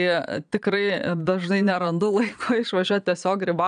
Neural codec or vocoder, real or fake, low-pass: vocoder, 22.05 kHz, 80 mel bands, Vocos; fake; 9.9 kHz